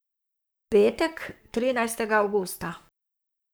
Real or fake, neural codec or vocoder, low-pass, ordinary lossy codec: fake; codec, 44.1 kHz, 7.8 kbps, Pupu-Codec; none; none